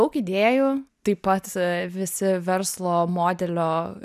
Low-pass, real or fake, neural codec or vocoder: 14.4 kHz; real; none